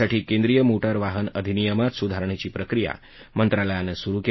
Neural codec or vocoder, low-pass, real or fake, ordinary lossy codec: none; 7.2 kHz; real; MP3, 24 kbps